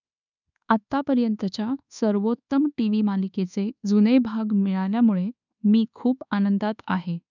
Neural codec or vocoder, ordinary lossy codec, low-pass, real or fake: codec, 24 kHz, 1.2 kbps, DualCodec; none; 7.2 kHz; fake